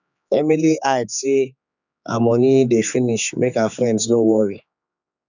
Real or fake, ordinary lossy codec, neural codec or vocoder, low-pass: fake; none; codec, 16 kHz, 4 kbps, X-Codec, HuBERT features, trained on general audio; 7.2 kHz